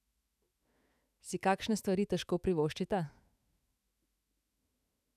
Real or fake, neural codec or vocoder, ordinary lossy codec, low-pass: fake; autoencoder, 48 kHz, 128 numbers a frame, DAC-VAE, trained on Japanese speech; none; 14.4 kHz